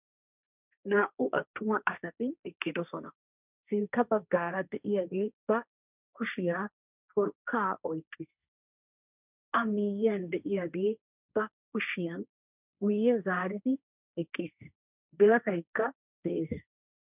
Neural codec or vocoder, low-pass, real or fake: codec, 16 kHz, 1.1 kbps, Voila-Tokenizer; 3.6 kHz; fake